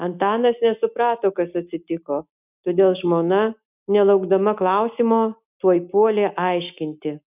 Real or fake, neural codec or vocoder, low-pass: real; none; 3.6 kHz